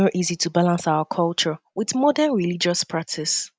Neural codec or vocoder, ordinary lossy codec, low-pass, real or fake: none; none; none; real